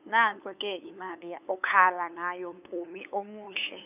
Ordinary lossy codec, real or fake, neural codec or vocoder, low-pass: none; fake; codec, 16 kHz, 8 kbps, FunCodec, trained on LibriTTS, 25 frames a second; 3.6 kHz